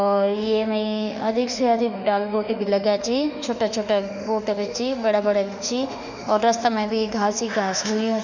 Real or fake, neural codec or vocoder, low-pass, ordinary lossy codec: fake; autoencoder, 48 kHz, 32 numbers a frame, DAC-VAE, trained on Japanese speech; 7.2 kHz; none